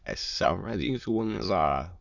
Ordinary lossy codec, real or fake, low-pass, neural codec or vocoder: Opus, 64 kbps; fake; 7.2 kHz; autoencoder, 22.05 kHz, a latent of 192 numbers a frame, VITS, trained on many speakers